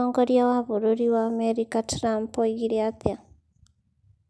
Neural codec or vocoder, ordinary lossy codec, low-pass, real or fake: none; none; none; real